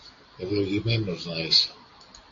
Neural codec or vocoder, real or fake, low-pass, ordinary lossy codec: none; real; 7.2 kHz; AAC, 48 kbps